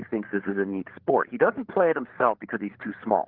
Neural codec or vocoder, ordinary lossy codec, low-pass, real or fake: codec, 16 kHz, 8 kbps, FreqCodec, larger model; Opus, 16 kbps; 5.4 kHz; fake